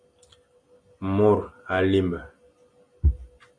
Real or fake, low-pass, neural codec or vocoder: real; 9.9 kHz; none